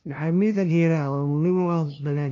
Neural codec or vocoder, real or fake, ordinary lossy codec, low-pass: codec, 16 kHz, 0.5 kbps, FunCodec, trained on LibriTTS, 25 frames a second; fake; none; 7.2 kHz